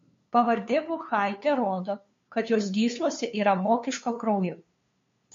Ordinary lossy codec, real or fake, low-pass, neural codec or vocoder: MP3, 48 kbps; fake; 7.2 kHz; codec, 16 kHz, 2 kbps, FunCodec, trained on LibriTTS, 25 frames a second